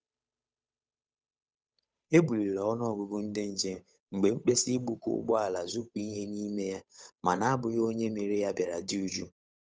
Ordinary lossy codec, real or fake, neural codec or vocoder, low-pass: none; fake; codec, 16 kHz, 8 kbps, FunCodec, trained on Chinese and English, 25 frames a second; none